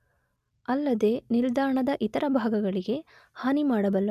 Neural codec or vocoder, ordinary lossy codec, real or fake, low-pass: none; none; real; 14.4 kHz